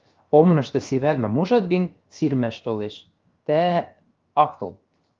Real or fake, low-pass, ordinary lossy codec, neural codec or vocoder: fake; 7.2 kHz; Opus, 32 kbps; codec, 16 kHz, 0.7 kbps, FocalCodec